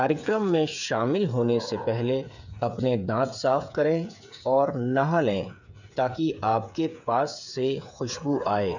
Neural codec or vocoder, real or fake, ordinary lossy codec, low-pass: codec, 16 kHz, 8 kbps, FreqCodec, smaller model; fake; none; 7.2 kHz